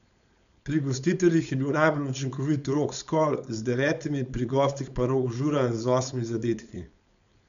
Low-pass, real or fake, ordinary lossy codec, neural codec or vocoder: 7.2 kHz; fake; none; codec, 16 kHz, 4.8 kbps, FACodec